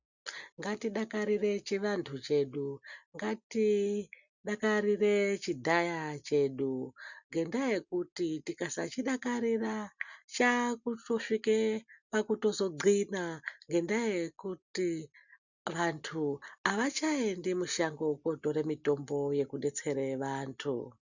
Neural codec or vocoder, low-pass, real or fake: none; 7.2 kHz; real